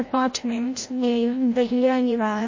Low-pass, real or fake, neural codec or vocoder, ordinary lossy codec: 7.2 kHz; fake; codec, 16 kHz, 0.5 kbps, FreqCodec, larger model; MP3, 32 kbps